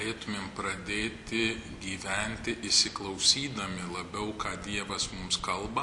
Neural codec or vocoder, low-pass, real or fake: none; 10.8 kHz; real